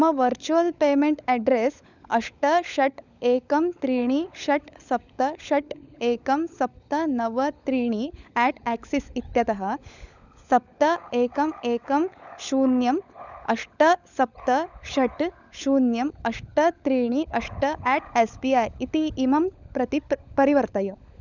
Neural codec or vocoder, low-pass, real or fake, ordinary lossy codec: codec, 16 kHz, 16 kbps, FunCodec, trained on LibriTTS, 50 frames a second; 7.2 kHz; fake; none